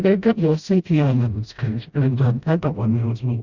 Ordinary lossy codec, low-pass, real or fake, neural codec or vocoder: Opus, 64 kbps; 7.2 kHz; fake; codec, 16 kHz, 0.5 kbps, FreqCodec, smaller model